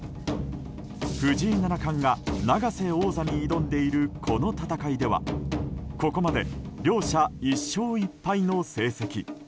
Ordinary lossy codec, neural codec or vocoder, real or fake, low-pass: none; none; real; none